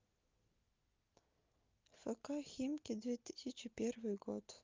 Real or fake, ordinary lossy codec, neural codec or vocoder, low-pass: real; Opus, 24 kbps; none; 7.2 kHz